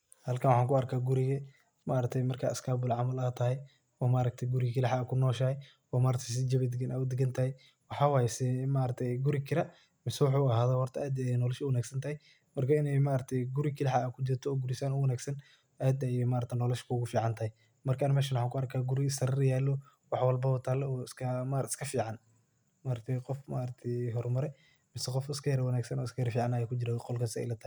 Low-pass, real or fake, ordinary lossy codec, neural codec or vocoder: none; real; none; none